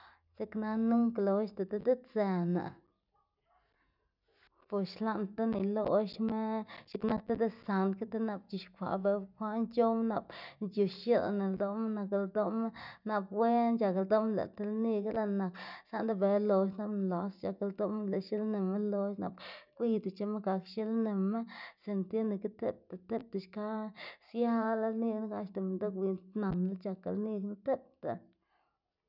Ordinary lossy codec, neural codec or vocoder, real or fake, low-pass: none; none; real; 5.4 kHz